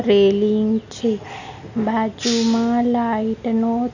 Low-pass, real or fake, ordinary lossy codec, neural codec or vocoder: 7.2 kHz; real; none; none